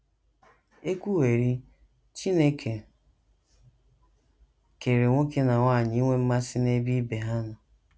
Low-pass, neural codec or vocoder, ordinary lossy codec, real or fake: none; none; none; real